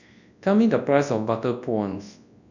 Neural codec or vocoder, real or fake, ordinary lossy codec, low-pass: codec, 24 kHz, 0.9 kbps, WavTokenizer, large speech release; fake; none; 7.2 kHz